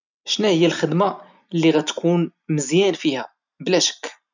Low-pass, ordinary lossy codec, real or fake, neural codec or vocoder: 7.2 kHz; none; real; none